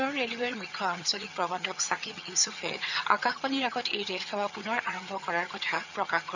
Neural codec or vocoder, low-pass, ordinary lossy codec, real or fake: vocoder, 22.05 kHz, 80 mel bands, HiFi-GAN; 7.2 kHz; none; fake